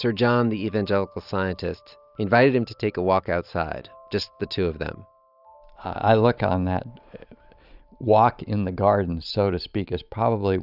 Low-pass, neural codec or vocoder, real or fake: 5.4 kHz; vocoder, 44.1 kHz, 128 mel bands every 512 samples, BigVGAN v2; fake